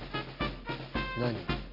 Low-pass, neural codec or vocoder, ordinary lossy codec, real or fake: 5.4 kHz; none; none; real